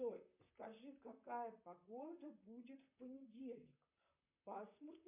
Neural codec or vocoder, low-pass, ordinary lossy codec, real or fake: vocoder, 44.1 kHz, 128 mel bands, Pupu-Vocoder; 3.6 kHz; MP3, 32 kbps; fake